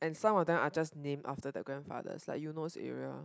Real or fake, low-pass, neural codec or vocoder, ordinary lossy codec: real; none; none; none